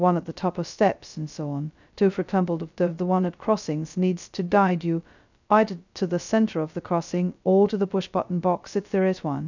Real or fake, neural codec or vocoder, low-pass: fake; codec, 16 kHz, 0.2 kbps, FocalCodec; 7.2 kHz